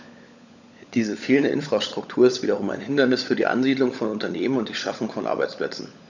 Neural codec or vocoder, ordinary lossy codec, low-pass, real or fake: codec, 16 kHz, 16 kbps, FunCodec, trained on LibriTTS, 50 frames a second; none; 7.2 kHz; fake